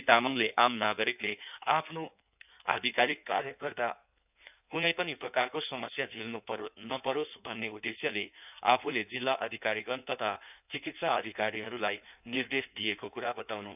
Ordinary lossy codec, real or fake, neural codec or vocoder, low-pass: none; fake; codec, 16 kHz in and 24 kHz out, 1.1 kbps, FireRedTTS-2 codec; 3.6 kHz